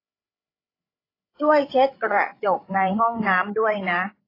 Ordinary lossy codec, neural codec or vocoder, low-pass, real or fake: AAC, 24 kbps; codec, 16 kHz, 8 kbps, FreqCodec, larger model; 5.4 kHz; fake